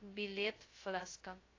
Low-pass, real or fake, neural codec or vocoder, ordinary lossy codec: 7.2 kHz; fake; codec, 16 kHz, 0.2 kbps, FocalCodec; AAC, 32 kbps